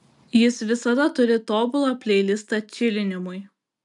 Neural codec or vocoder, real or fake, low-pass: none; real; 10.8 kHz